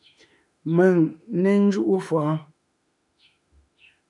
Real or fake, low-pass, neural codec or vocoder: fake; 10.8 kHz; autoencoder, 48 kHz, 32 numbers a frame, DAC-VAE, trained on Japanese speech